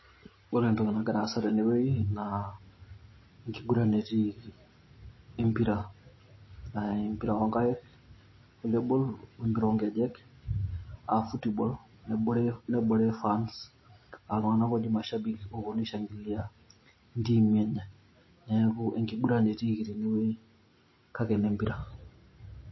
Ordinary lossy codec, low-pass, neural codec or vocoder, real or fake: MP3, 24 kbps; 7.2 kHz; none; real